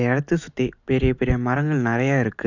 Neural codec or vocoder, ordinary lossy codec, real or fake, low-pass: none; none; real; 7.2 kHz